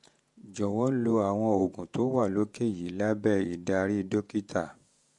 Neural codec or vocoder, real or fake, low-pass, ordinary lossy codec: vocoder, 48 kHz, 128 mel bands, Vocos; fake; 10.8 kHz; MP3, 64 kbps